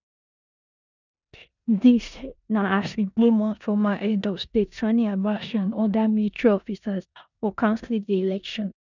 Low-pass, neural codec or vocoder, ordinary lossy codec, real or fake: 7.2 kHz; codec, 16 kHz in and 24 kHz out, 0.9 kbps, LongCat-Audio-Codec, four codebook decoder; none; fake